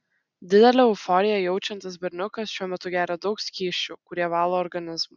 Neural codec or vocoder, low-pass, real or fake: none; 7.2 kHz; real